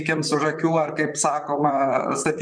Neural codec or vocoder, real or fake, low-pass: vocoder, 44.1 kHz, 128 mel bands, Pupu-Vocoder; fake; 9.9 kHz